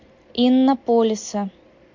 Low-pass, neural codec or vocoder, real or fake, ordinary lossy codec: 7.2 kHz; none; real; MP3, 48 kbps